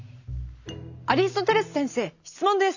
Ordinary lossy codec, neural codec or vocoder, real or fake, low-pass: none; none; real; 7.2 kHz